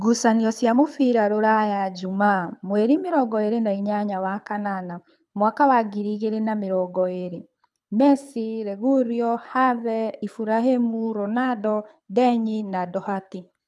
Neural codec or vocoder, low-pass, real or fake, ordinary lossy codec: codec, 24 kHz, 6 kbps, HILCodec; none; fake; none